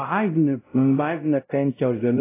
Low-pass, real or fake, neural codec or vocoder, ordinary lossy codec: 3.6 kHz; fake; codec, 16 kHz, 0.5 kbps, X-Codec, WavLM features, trained on Multilingual LibriSpeech; AAC, 24 kbps